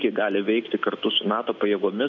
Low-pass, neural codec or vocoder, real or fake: 7.2 kHz; none; real